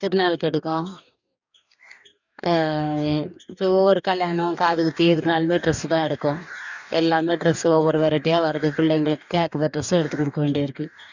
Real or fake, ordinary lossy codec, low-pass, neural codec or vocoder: fake; none; 7.2 kHz; codec, 44.1 kHz, 2.6 kbps, DAC